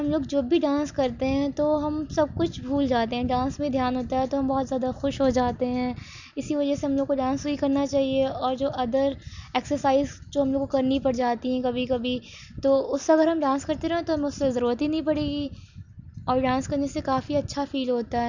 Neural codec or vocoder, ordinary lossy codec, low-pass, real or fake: none; none; 7.2 kHz; real